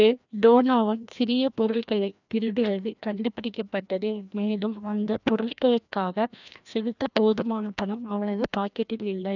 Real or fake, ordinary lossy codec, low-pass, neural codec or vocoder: fake; none; 7.2 kHz; codec, 16 kHz, 1 kbps, FreqCodec, larger model